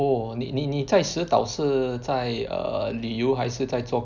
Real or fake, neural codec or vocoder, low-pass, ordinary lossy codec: real; none; 7.2 kHz; none